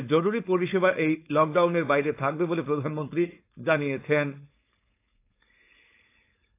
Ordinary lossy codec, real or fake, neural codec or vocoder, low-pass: AAC, 24 kbps; fake; codec, 16 kHz, 4.8 kbps, FACodec; 3.6 kHz